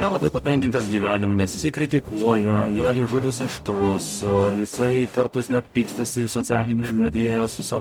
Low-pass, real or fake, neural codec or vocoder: 19.8 kHz; fake; codec, 44.1 kHz, 0.9 kbps, DAC